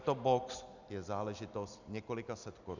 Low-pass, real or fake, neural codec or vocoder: 7.2 kHz; real; none